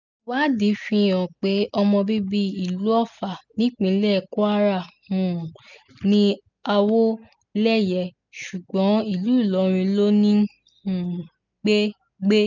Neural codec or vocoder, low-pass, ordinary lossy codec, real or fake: none; 7.2 kHz; none; real